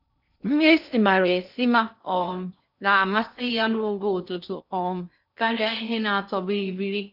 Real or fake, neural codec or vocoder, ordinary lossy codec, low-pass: fake; codec, 16 kHz in and 24 kHz out, 0.6 kbps, FocalCodec, streaming, 2048 codes; none; 5.4 kHz